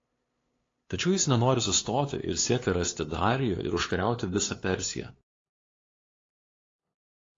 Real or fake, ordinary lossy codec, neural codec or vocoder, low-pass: fake; AAC, 32 kbps; codec, 16 kHz, 2 kbps, FunCodec, trained on LibriTTS, 25 frames a second; 7.2 kHz